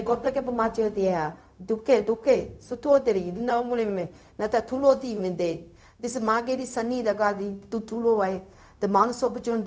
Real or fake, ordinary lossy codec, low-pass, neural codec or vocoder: fake; none; none; codec, 16 kHz, 0.4 kbps, LongCat-Audio-Codec